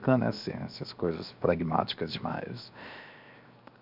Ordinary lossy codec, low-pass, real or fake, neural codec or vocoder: none; 5.4 kHz; fake; codec, 16 kHz, 0.7 kbps, FocalCodec